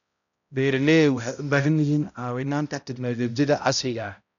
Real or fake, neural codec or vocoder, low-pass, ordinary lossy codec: fake; codec, 16 kHz, 0.5 kbps, X-Codec, HuBERT features, trained on balanced general audio; 7.2 kHz; none